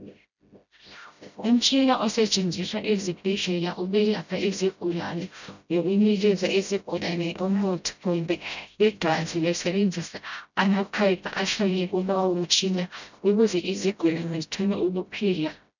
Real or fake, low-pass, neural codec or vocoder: fake; 7.2 kHz; codec, 16 kHz, 0.5 kbps, FreqCodec, smaller model